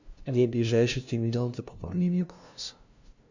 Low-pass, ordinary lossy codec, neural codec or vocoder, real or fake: 7.2 kHz; Opus, 64 kbps; codec, 16 kHz, 0.5 kbps, FunCodec, trained on LibriTTS, 25 frames a second; fake